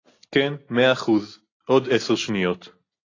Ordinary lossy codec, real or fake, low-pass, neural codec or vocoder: AAC, 32 kbps; real; 7.2 kHz; none